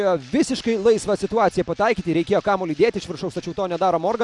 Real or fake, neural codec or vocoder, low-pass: real; none; 10.8 kHz